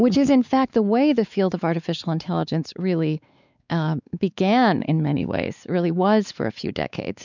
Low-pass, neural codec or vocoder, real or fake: 7.2 kHz; codec, 16 kHz, 4 kbps, X-Codec, WavLM features, trained on Multilingual LibriSpeech; fake